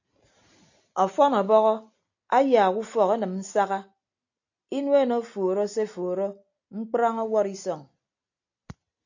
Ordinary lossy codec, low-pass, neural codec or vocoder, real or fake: AAC, 48 kbps; 7.2 kHz; none; real